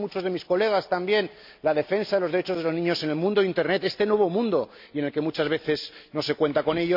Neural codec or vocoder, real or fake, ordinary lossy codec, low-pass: none; real; MP3, 48 kbps; 5.4 kHz